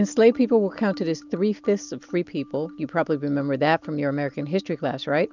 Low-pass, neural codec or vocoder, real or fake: 7.2 kHz; none; real